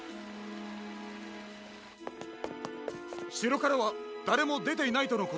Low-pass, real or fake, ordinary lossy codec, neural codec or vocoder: none; real; none; none